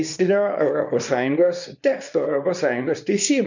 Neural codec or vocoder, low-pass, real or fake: codec, 24 kHz, 0.9 kbps, WavTokenizer, small release; 7.2 kHz; fake